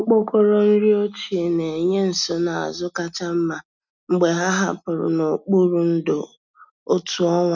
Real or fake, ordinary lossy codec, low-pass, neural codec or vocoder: real; none; 7.2 kHz; none